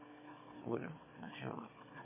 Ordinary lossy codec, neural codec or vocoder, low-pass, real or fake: MP3, 16 kbps; autoencoder, 22.05 kHz, a latent of 192 numbers a frame, VITS, trained on one speaker; 3.6 kHz; fake